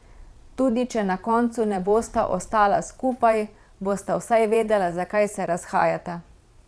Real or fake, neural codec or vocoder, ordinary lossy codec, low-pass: fake; vocoder, 22.05 kHz, 80 mel bands, Vocos; none; none